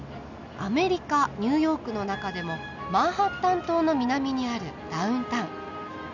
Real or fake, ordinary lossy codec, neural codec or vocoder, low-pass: real; none; none; 7.2 kHz